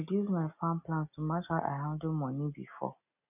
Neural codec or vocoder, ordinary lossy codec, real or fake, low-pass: none; none; real; 3.6 kHz